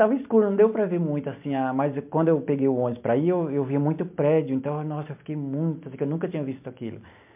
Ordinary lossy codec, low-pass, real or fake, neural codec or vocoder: MP3, 32 kbps; 3.6 kHz; real; none